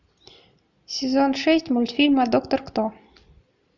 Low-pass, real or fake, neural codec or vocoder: 7.2 kHz; real; none